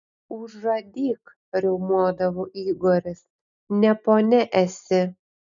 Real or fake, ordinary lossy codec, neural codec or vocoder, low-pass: real; MP3, 96 kbps; none; 7.2 kHz